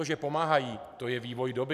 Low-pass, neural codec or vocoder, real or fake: 14.4 kHz; none; real